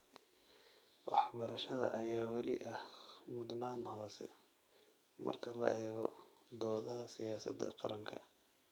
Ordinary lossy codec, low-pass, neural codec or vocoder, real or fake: none; none; codec, 44.1 kHz, 2.6 kbps, SNAC; fake